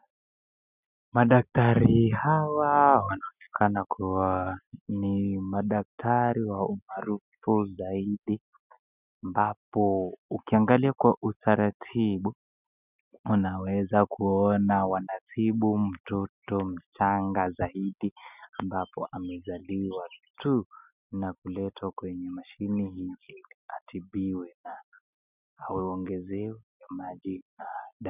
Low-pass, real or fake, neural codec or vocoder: 3.6 kHz; real; none